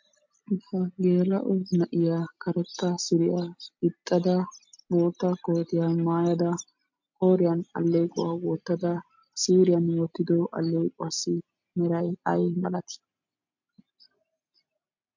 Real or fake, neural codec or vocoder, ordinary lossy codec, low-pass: real; none; MP3, 48 kbps; 7.2 kHz